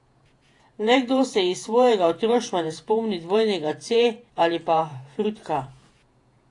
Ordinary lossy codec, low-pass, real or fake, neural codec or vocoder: AAC, 48 kbps; 10.8 kHz; fake; vocoder, 48 kHz, 128 mel bands, Vocos